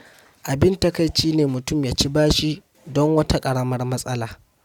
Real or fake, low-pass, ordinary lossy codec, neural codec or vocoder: real; 19.8 kHz; none; none